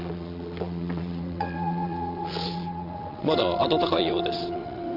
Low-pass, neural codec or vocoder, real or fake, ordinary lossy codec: 5.4 kHz; vocoder, 22.05 kHz, 80 mel bands, WaveNeXt; fake; none